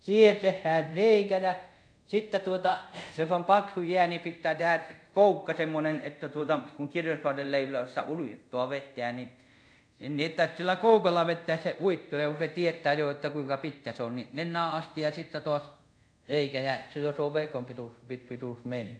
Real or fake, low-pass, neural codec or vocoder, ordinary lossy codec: fake; 9.9 kHz; codec, 24 kHz, 0.5 kbps, DualCodec; none